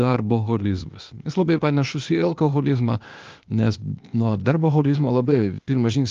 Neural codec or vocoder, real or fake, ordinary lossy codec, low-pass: codec, 16 kHz, 0.8 kbps, ZipCodec; fake; Opus, 32 kbps; 7.2 kHz